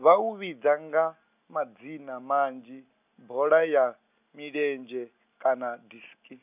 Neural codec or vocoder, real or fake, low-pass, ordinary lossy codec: none; real; 3.6 kHz; none